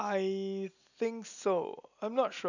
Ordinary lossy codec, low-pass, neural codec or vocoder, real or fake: none; 7.2 kHz; none; real